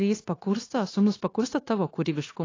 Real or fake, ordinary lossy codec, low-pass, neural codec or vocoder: fake; AAC, 32 kbps; 7.2 kHz; codec, 16 kHz, 0.9 kbps, LongCat-Audio-Codec